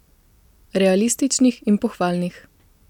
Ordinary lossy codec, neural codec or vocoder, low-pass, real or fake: none; none; 19.8 kHz; real